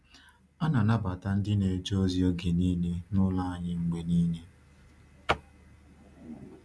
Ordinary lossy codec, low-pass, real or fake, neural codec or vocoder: none; none; real; none